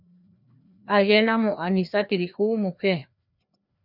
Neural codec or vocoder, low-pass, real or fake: codec, 16 kHz, 2 kbps, FreqCodec, larger model; 5.4 kHz; fake